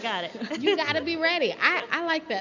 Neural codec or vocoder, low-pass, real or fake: none; 7.2 kHz; real